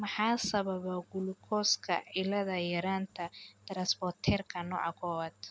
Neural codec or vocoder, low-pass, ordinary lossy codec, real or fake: none; none; none; real